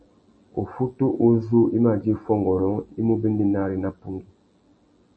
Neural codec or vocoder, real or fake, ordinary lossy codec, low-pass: none; real; MP3, 32 kbps; 9.9 kHz